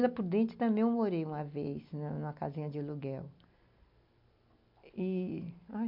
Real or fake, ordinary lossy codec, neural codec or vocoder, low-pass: real; MP3, 48 kbps; none; 5.4 kHz